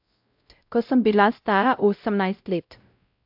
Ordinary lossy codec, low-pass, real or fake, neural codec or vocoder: AAC, 48 kbps; 5.4 kHz; fake; codec, 16 kHz, 0.5 kbps, X-Codec, WavLM features, trained on Multilingual LibriSpeech